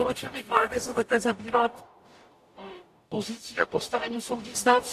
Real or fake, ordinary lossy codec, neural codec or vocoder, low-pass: fake; AAC, 64 kbps; codec, 44.1 kHz, 0.9 kbps, DAC; 14.4 kHz